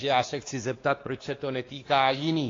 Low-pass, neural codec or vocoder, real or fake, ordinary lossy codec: 7.2 kHz; codec, 16 kHz, 2 kbps, X-Codec, WavLM features, trained on Multilingual LibriSpeech; fake; AAC, 32 kbps